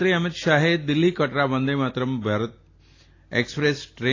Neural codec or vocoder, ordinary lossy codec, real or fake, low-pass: none; AAC, 32 kbps; real; 7.2 kHz